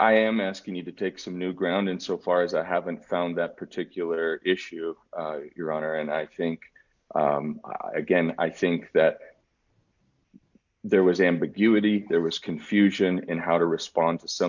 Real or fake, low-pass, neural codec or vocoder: real; 7.2 kHz; none